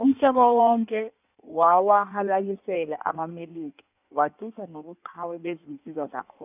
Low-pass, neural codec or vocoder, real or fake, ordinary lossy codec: 3.6 kHz; codec, 16 kHz in and 24 kHz out, 1.1 kbps, FireRedTTS-2 codec; fake; none